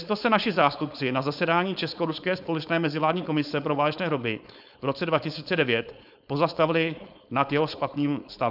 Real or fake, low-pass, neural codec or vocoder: fake; 5.4 kHz; codec, 16 kHz, 4.8 kbps, FACodec